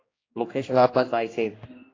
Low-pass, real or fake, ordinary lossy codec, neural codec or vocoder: 7.2 kHz; fake; AAC, 32 kbps; codec, 16 kHz, 1 kbps, X-Codec, HuBERT features, trained on general audio